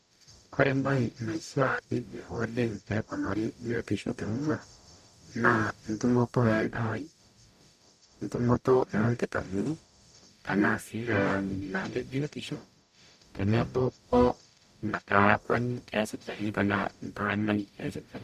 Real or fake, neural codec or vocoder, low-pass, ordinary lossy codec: fake; codec, 44.1 kHz, 0.9 kbps, DAC; 14.4 kHz; none